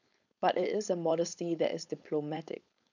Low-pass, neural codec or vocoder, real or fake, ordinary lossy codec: 7.2 kHz; codec, 16 kHz, 4.8 kbps, FACodec; fake; none